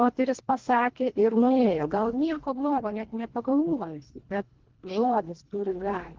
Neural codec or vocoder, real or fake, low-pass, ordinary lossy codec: codec, 24 kHz, 1.5 kbps, HILCodec; fake; 7.2 kHz; Opus, 16 kbps